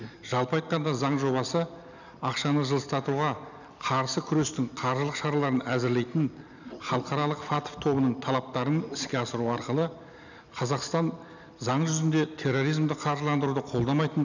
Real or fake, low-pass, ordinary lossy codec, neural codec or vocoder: real; 7.2 kHz; none; none